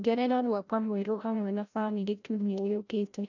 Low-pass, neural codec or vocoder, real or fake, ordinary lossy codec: 7.2 kHz; codec, 16 kHz, 0.5 kbps, FreqCodec, larger model; fake; none